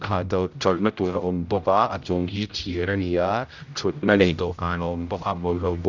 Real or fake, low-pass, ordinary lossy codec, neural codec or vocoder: fake; 7.2 kHz; none; codec, 16 kHz, 0.5 kbps, X-Codec, HuBERT features, trained on general audio